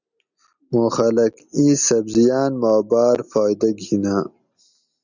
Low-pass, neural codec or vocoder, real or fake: 7.2 kHz; none; real